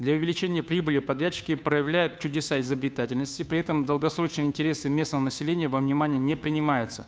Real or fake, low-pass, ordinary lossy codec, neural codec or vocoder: fake; none; none; codec, 16 kHz, 2 kbps, FunCodec, trained on Chinese and English, 25 frames a second